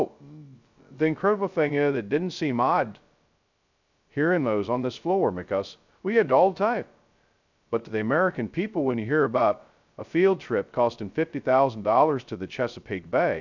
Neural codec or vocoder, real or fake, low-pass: codec, 16 kHz, 0.2 kbps, FocalCodec; fake; 7.2 kHz